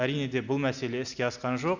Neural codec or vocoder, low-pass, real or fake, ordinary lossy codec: none; 7.2 kHz; real; none